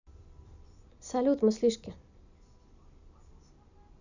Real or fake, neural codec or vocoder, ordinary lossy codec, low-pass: real; none; none; 7.2 kHz